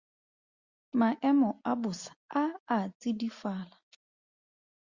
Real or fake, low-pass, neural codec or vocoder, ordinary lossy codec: real; 7.2 kHz; none; Opus, 64 kbps